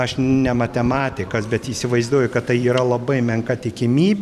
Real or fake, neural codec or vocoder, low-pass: fake; vocoder, 44.1 kHz, 128 mel bands every 512 samples, BigVGAN v2; 14.4 kHz